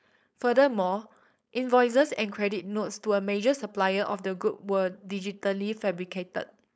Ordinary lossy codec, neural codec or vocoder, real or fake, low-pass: none; codec, 16 kHz, 4.8 kbps, FACodec; fake; none